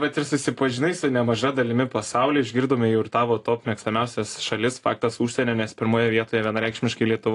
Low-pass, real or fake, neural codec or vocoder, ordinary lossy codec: 10.8 kHz; real; none; AAC, 48 kbps